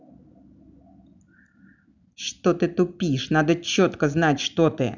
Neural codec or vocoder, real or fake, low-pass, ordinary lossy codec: none; real; 7.2 kHz; none